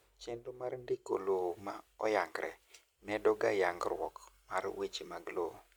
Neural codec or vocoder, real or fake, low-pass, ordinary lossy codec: none; real; none; none